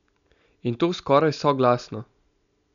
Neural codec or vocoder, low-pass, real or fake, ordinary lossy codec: none; 7.2 kHz; real; none